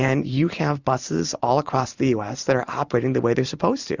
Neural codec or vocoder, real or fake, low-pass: vocoder, 44.1 kHz, 128 mel bands every 512 samples, BigVGAN v2; fake; 7.2 kHz